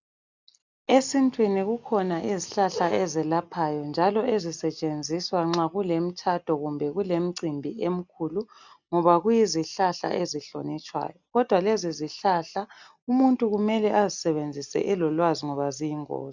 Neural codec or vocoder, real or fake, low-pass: none; real; 7.2 kHz